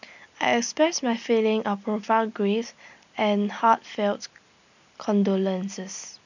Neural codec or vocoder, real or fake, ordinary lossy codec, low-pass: none; real; none; 7.2 kHz